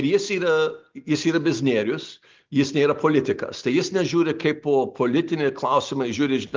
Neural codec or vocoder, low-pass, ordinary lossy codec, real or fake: none; 7.2 kHz; Opus, 32 kbps; real